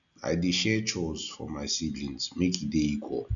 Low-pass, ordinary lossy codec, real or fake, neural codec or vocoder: 7.2 kHz; none; real; none